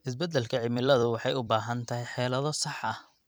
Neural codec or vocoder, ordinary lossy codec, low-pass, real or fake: vocoder, 44.1 kHz, 128 mel bands every 256 samples, BigVGAN v2; none; none; fake